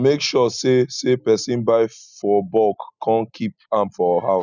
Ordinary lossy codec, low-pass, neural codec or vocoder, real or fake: none; 7.2 kHz; none; real